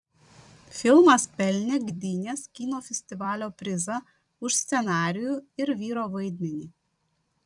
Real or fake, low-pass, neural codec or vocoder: fake; 10.8 kHz; vocoder, 44.1 kHz, 128 mel bands every 256 samples, BigVGAN v2